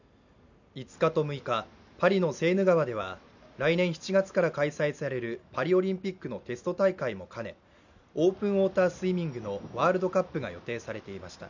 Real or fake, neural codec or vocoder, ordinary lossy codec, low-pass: real; none; none; 7.2 kHz